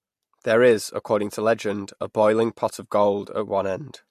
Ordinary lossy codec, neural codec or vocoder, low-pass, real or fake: MP3, 64 kbps; none; 14.4 kHz; real